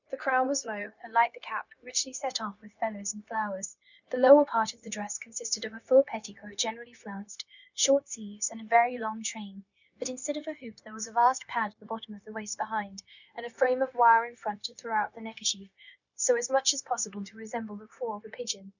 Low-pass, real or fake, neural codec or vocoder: 7.2 kHz; fake; codec, 16 kHz, 0.9 kbps, LongCat-Audio-Codec